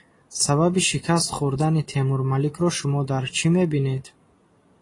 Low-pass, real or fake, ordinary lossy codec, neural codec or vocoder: 10.8 kHz; real; AAC, 32 kbps; none